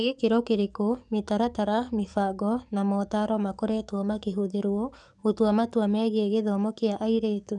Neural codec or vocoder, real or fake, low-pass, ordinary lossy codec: codec, 44.1 kHz, 7.8 kbps, DAC; fake; 10.8 kHz; none